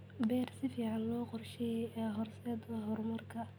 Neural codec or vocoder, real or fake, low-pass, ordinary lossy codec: none; real; none; none